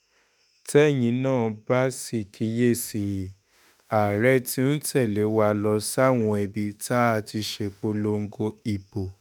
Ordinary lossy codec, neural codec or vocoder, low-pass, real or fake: none; autoencoder, 48 kHz, 32 numbers a frame, DAC-VAE, trained on Japanese speech; none; fake